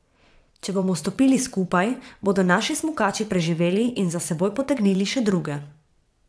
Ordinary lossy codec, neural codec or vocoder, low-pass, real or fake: none; vocoder, 22.05 kHz, 80 mel bands, WaveNeXt; none; fake